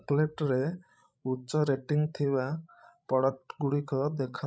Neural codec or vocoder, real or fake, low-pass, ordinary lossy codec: codec, 16 kHz, 16 kbps, FreqCodec, larger model; fake; none; none